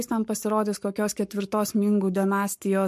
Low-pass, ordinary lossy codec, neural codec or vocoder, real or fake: 14.4 kHz; MP3, 64 kbps; codec, 44.1 kHz, 7.8 kbps, Pupu-Codec; fake